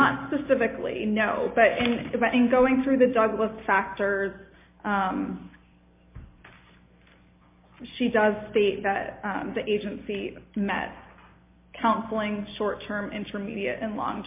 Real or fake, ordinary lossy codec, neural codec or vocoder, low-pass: real; MP3, 24 kbps; none; 3.6 kHz